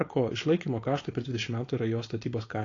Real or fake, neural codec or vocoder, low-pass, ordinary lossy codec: fake; codec, 16 kHz, 4.8 kbps, FACodec; 7.2 kHz; AAC, 32 kbps